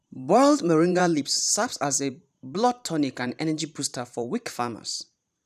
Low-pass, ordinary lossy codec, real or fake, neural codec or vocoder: 14.4 kHz; none; fake; vocoder, 44.1 kHz, 128 mel bands every 512 samples, BigVGAN v2